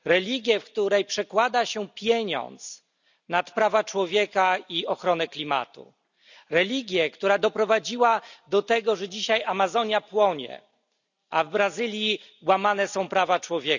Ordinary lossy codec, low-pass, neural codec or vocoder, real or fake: none; 7.2 kHz; none; real